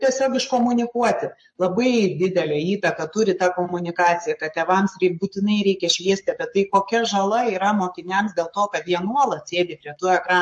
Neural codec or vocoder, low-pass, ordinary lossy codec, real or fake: codec, 44.1 kHz, 7.8 kbps, Pupu-Codec; 10.8 kHz; MP3, 48 kbps; fake